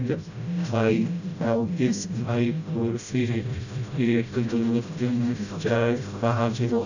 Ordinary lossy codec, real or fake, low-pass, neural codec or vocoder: none; fake; 7.2 kHz; codec, 16 kHz, 0.5 kbps, FreqCodec, smaller model